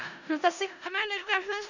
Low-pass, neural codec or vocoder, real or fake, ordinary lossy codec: 7.2 kHz; codec, 16 kHz in and 24 kHz out, 0.4 kbps, LongCat-Audio-Codec, four codebook decoder; fake; none